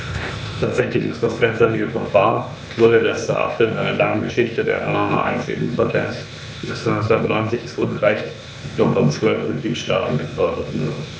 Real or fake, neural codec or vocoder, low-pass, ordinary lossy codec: fake; codec, 16 kHz, 0.8 kbps, ZipCodec; none; none